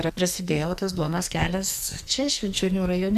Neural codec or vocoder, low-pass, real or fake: codec, 44.1 kHz, 2.6 kbps, SNAC; 14.4 kHz; fake